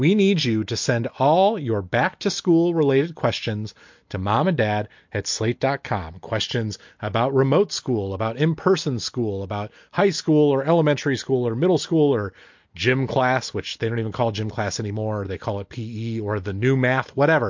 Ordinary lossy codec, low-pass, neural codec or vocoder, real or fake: MP3, 48 kbps; 7.2 kHz; none; real